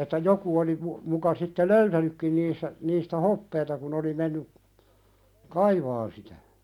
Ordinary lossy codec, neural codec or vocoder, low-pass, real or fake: none; none; 19.8 kHz; real